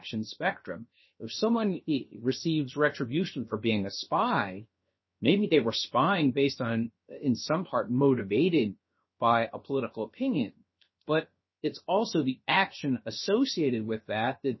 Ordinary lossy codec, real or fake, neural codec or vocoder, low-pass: MP3, 24 kbps; fake; codec, 16 kHz, 0.7 kbps, FocalCodec; 7.2 kHz